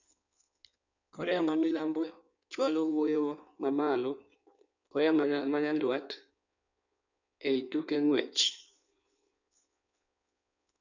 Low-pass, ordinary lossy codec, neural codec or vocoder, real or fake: 7.2 kHz; none; codec, 16 kHz in and 24 kHz out, 1.1 kbps, FireRedTTS-2 codec; fake